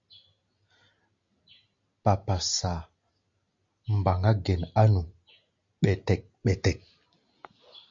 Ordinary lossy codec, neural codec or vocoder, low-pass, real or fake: MP3, 48 kbps; none; 7.2 kHz; real